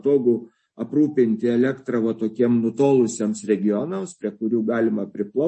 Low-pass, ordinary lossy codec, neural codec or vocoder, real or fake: 10.8 kHz; MP3, 32 kbps; none; real